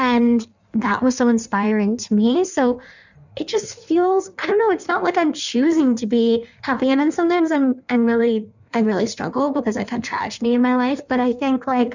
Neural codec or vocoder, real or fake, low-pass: codec, 16 kHz in and 24 kHz out, 1.1 kbps, FireRedTTS-2 codec; fake; 7.2 kHz